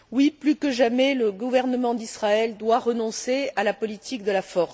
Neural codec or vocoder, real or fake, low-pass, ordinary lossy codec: none; real; none; none